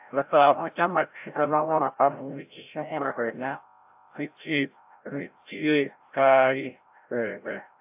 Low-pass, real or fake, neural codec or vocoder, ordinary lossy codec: 3.6 kHz; fake; codec, 16 kHz, 0.5 kbps, FreqCodec, larger model; AAC, 32 kbps